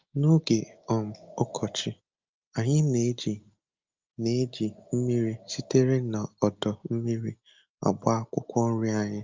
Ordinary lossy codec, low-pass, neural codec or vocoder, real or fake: Opus, 24 kbps; 7.2 kHz; none; real